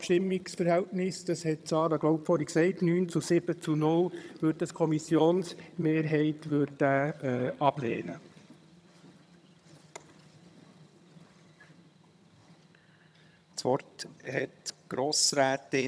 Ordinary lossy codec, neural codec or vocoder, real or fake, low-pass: none; vocoder, 22.05 kHz, 80 mel bands, HiFi-GAN; fake; none